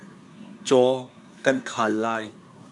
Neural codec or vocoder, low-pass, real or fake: codec, 24 kHz, 1 kbps, SNAC; 10.8 kHz; fake